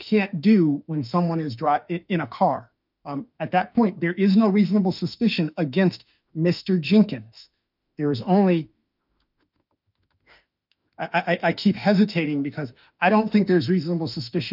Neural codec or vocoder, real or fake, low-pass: autoencoder, 48 kHz, 32 numbers a frame, DAC-VAE, trained on Japanese speech; fake; 5.4 kHz